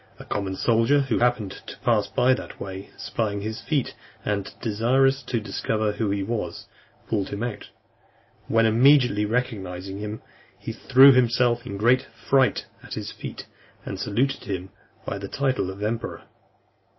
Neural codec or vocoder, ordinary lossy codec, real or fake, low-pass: none; MP3, 24 kbps; real; 7.2 kHz